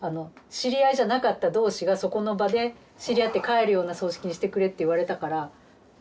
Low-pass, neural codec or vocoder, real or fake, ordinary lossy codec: none; none; real; none